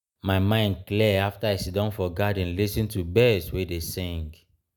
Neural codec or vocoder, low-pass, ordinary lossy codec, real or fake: none; none; none; real